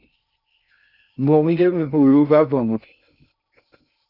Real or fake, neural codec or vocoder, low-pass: fake; codec, 16 kHz in and 24 kHz out, 0.6 kbps, FocalCodec, streaming, 2048 codes; 5.4 kHz